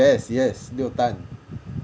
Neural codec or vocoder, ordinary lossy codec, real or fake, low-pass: none; none; real; none